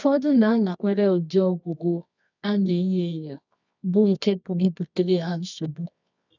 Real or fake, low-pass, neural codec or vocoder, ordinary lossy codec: fake; 7.2 kHz; codec, 24 kHz, 0.9 kbps, WavTokenizer, medium music audio release; none